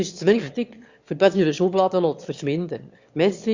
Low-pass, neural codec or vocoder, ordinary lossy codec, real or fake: 7.2 kHz; autoencoder, 22.05 kHz, a latent of 192 numbers a frame, VITS, trained on one speaker; Opus, 64 kbps; fake